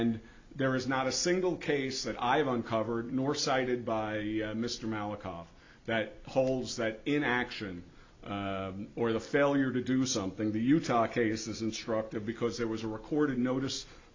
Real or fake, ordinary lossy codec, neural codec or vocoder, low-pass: real; MP3, 64 kbps; none; 7.2 kHz